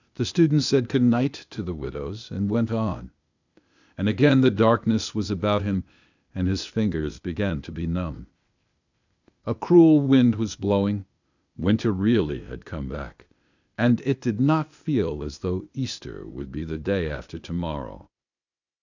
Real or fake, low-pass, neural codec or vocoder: fake; 7.2 kHz; codec, 16 kHz, 0.8 kbps, ZipCodec